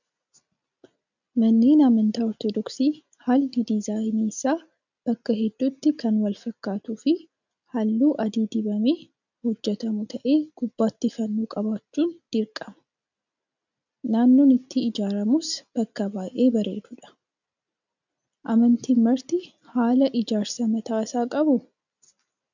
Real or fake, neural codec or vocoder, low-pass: real; none; 7.2 kHz